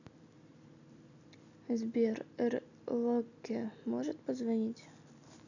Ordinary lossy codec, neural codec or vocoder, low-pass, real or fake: none; none; 7.2 kHz; real